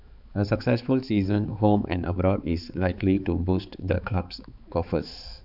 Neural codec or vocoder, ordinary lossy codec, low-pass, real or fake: codec, 16 kHz, 4 kbps, X-Codec, HuBERT features, trained on balanced general audio; none; 5.4 kHz; fake